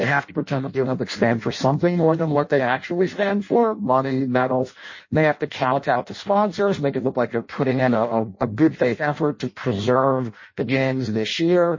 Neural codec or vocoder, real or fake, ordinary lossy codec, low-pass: codec, 16 kHz in and 24 kHz out, 0.6 kbps, FireRedTTS-2 codec; fake; MP3, 32 kbps; 7.2 kHz